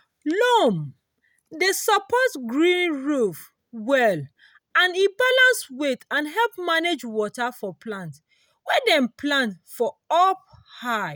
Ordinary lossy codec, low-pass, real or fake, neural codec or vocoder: none; none; real; none